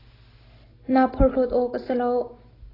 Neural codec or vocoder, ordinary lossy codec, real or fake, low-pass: none; AAC, 24 kbps; real; 5.4 kHz